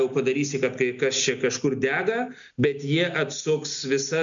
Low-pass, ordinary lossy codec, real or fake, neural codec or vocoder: 7.2 kHz; MP3, 64 kbps; real; none